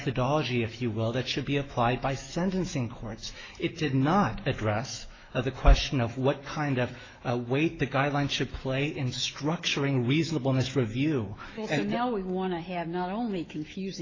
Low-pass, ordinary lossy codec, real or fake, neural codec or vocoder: 7.2 kHz; AAC, 32 kbps; fake; autoencoder, 48 kHz, 128 numbers a frame, DAC-VAE, trained on Japanese speech